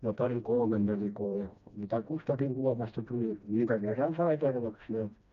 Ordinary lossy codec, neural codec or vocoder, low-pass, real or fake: none; codec, 16 kHz, 1 kbps, FreqCodec, smaller model; 7.2 kHz; fake